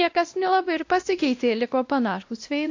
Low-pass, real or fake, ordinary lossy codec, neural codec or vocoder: 7.2 kHz; fake; AAC, 48 kbps; codec, 16 kHz, 1 kbps, X-Codec, WavLM features, trained on Multilingual LibriSpeech